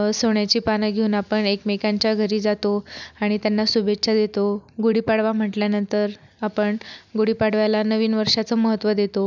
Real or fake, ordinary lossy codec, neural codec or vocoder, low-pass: real; none; none; 7.2 kHz